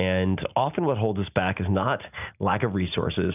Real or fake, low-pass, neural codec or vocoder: real; 3.6 kHz; none